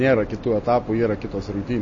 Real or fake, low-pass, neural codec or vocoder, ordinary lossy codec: real; 7.2 kHz; none; MP3, 32 kbps